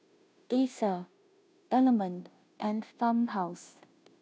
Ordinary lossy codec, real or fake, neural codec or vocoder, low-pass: none; fake; codec, 16 kHz, 0.5 kbps, FunCodec, trained on Chinese and English, 25 frames a second; none